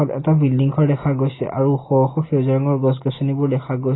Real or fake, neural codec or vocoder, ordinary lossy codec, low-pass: real; none; AAC, 16 kbps; 7.2 kHz